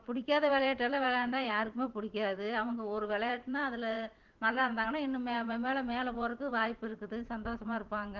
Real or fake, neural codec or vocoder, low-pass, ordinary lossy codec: fake; vocoder, 44.1 kHz, 128 mel bands every 512 samples, BigVGAN v2; 7.2 kHz; Opus, 16 kbps